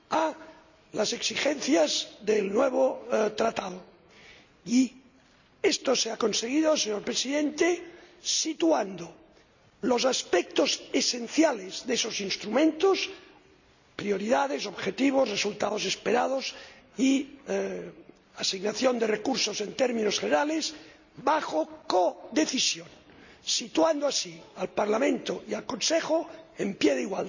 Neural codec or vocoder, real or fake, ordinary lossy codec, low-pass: none; real; none; 7.2 kHz